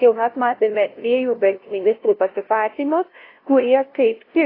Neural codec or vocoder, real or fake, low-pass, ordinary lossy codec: codec, 16 kHz, 0.5 kbps, FunCodec, trained on LibriTTS, 25 frames a second; fake; 5.4 kHz; AAC, 24 kbps